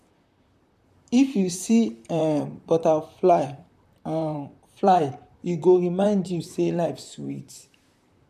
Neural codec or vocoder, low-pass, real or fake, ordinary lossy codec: vocoder, 44.1 kHz, 128 mel bands, Pupu-Vocoder; 14.4 kHz; fake; none